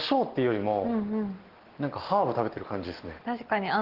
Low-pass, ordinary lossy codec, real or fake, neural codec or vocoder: 5.4 kHz; Opus, 16 kbps; real; none